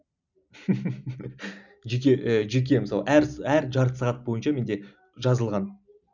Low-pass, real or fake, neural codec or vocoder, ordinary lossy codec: 7.2 kHz; real; none; none